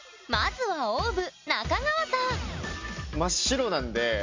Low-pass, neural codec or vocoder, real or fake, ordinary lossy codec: 7.2 kHz; none; real; MP3, 64 kbps